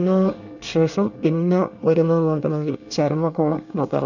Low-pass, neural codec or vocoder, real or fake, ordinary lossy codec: 7.2 kHz; codec, 24 kHz, 1 kbps, SNAC; fake; none